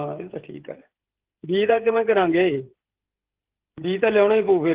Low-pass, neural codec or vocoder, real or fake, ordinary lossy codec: 3.6 kHz; codec, 16 kHz, 16 kbps, FreqCodec, smaller model; fake; Opus, 16 kbps